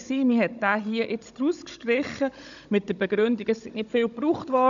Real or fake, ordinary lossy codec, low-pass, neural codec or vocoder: fake; none; 7.2 kHz; codec, 16 kHz, 16 kbps, FunCodec, trained on Chinese and English, 50 frames a second